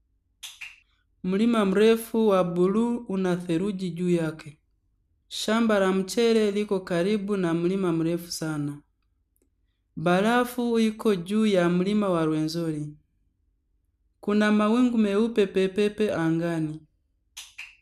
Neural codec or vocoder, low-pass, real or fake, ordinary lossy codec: none; 14.4 kHz; real; none